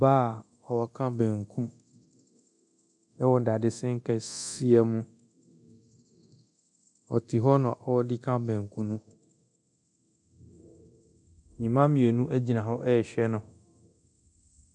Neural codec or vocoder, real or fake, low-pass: codec, 24 kHz, 0.9 kbps, DualCodec; fake; 10.8 kHz